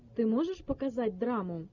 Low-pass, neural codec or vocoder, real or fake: 7.2 kHz; none; real